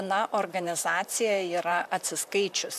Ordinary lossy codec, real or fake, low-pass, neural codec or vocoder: AAC, 96 kbps; fake; 14.4 kHz; vocoder, 44.1 kHz, 128 mel bands, Pupu-Vocoder